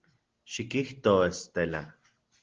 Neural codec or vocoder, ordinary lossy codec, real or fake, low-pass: none; Opus, 16 kbps; real; 7.2 kHz